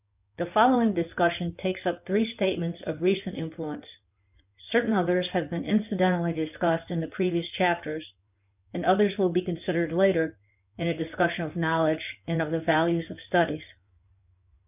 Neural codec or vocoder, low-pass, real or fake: codec, 16 kHz in and 24 kHz out, 2.2 kbps, FireRedTTS-2 codec; 3.6 kHz; fake